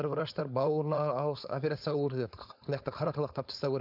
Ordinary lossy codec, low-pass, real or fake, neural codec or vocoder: none; 5.4 kHz; fake; codec, 16 kHz, 4.8 kbps, FACodec